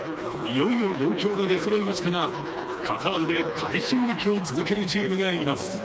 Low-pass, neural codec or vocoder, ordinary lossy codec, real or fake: none; codec, 16 kHz, 2 kbps, FreqCodec, smaller model; none; fake